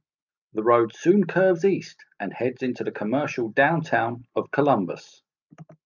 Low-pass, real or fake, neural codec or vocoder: 7.2 kHz; real; none